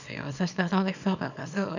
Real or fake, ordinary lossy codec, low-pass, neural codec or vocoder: fake; none; 7.2 kHz; codec, 24 kHz, 0.9 kbps, WavTokenizer, small release